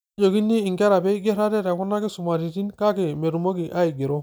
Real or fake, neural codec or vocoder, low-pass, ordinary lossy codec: real; none; none; none